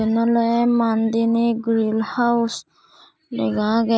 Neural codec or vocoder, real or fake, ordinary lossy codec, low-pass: none; real; none; none